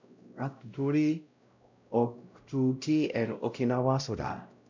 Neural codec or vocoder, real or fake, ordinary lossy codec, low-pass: codec, 16 kHz, 0.5 kbps, X-Codec, WavLM features, trained on Multilingual LibriSpeech; fake; MP3, 64 kbps; 7.2 kHz